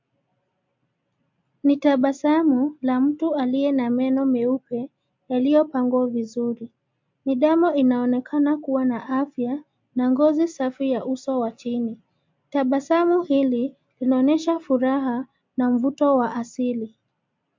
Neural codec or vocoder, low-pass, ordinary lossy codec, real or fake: none; 7.2 kHz; MP3, 48 kbps; real